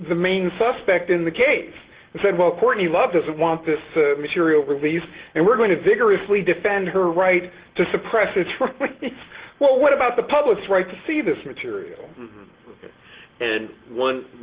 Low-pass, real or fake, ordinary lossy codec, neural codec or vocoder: 3.6 kHz; real; Opus, 16 kbps; none